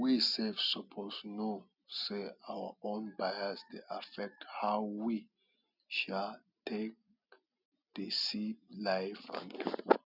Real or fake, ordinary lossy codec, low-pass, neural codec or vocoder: real; none; 5.4 kHz; none